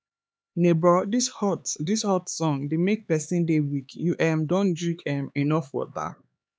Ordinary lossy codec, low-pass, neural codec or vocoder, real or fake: none; none; codec, 16 kHz, 4 kbps, X-Codec, HuBERT features, trained on LibriSpeech; fake